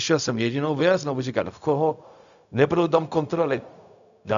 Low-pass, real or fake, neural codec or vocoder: 7.2 kHz; fake; codec, 16 kHz, 0.4 kbps, LongCat-Audio-Codec